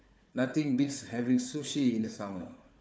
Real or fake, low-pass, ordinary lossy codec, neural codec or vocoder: fake; none; none; codec, 16 kHz, 4 kbps, FunCodec, trained on Chinese and English, 50 frames a second